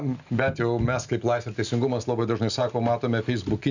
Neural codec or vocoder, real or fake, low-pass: none; real; 7.2 kHz